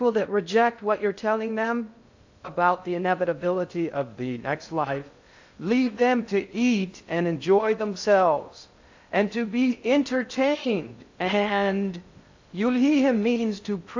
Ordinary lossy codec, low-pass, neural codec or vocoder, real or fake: AAC, 48 kbps; 7.2 kHz; codec, 16 kHz in and 24 kHz out, 0.6 kbps, FocalCodec, streaming, 2048 codes; fake